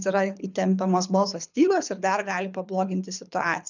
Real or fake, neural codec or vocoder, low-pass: fake; codec, 24 kHz, 6 kbps, HILCodec; 7.2 kHz